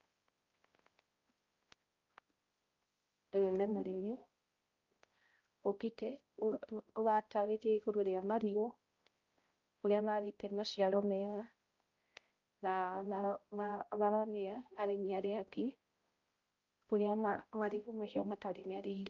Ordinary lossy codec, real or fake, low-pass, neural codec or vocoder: Opus, 32 kbps; fake; 7.2 kHz; codec, 16 kHz, 0.5 kbps, X-Codec, HuBERT features, trained on balanced general audio